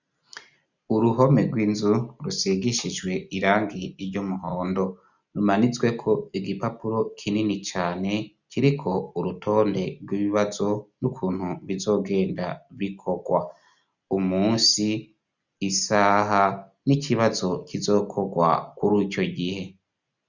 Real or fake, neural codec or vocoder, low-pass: real; none; 7.2 kHz